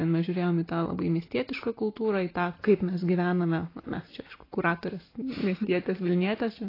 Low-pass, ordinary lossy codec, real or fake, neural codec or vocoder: 5.4 kHz; AAC, 24 kbps; real; none